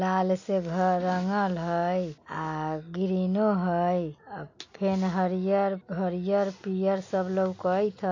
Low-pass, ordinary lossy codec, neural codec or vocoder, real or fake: 7.2 kHz; AAC, 48 kbps; none; real